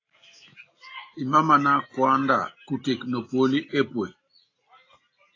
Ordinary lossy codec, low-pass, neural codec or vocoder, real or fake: AAC, 32 kbps; 7.2 kHz; none; real